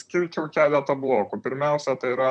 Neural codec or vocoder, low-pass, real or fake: vocoder, 44.1 kHz, 128 mel bands, Pupu-Vocoder; 9.9 kHz; fake